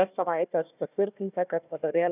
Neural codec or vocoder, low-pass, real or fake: codec, 16 kHz, 1 kbps, FunCodec, trained on Chinese and English, 50 frames a second; 3.6 kHz; fake